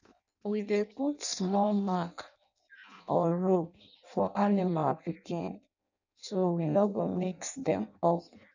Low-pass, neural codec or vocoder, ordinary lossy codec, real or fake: 7.2 kHz; codec, 16 kHz in and 24 kHz out, 0.6 kbps, FireRedTTS-2 codec; none; fake